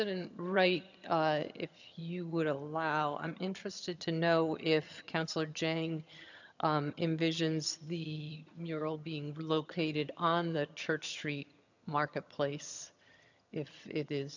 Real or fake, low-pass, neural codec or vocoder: fake; 7.2 kHz; vocoder, 22.05 kHz, 80 mel bands, HiFi-GAN